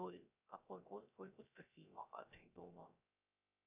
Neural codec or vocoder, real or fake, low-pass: codec, 16 kHz, 0.3 kbps, FocalCodec; fake; 3.6 kHz